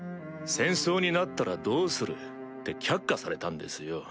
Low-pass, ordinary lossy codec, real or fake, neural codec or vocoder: none; none; real; none